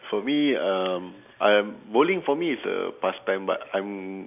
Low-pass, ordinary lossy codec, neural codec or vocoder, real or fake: 3.6 kHz; none; none; real